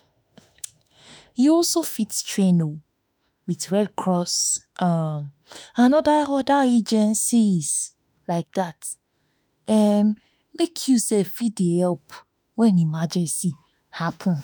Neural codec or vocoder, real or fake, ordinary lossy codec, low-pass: autoencoder, 48 kHz, 32 numbers a frame, DAC-VAE, trained on Japanese speech; fake; none; none